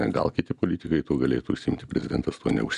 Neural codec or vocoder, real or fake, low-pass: none; real; 10.8 kHz